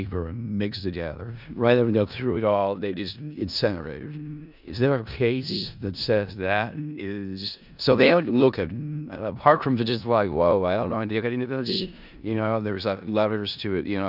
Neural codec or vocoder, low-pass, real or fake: codec, 16 kHz in and 24 kHz out, 0.4 kbps, LongCat-Audio-Codec, four codebook decoder; 5.4 kHz; fake